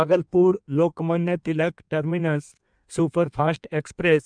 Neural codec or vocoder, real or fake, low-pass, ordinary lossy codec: codec, 16 kHz in and 24 kHz out, 1.1 kbps, FireRedTTS-2 codec; fake; 9.9 kHz; none